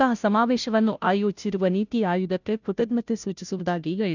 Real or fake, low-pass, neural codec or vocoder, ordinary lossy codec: fake; 7.2 kHz; codec, 16 kHz, 0.5 kbps, FunCodec, trained on Chinese and English, 25 frames a second; none